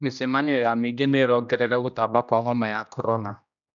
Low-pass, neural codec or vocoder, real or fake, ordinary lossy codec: 7.2 kHz; codec, 16 kHz, 1 kbps, X-Codec, HuBERT features, trained on general audio; fake; none